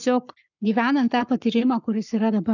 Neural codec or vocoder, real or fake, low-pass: codec, 44.1 kHz, 7.8 kbps, Pupu-Codec; fake; 7.2 kHz